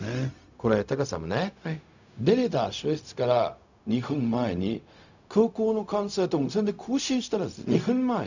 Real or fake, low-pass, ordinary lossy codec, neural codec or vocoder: fake; 7.2 kHz; none; codec, 16 kHz, 0.4 kbps, LongCat-Audio-Codec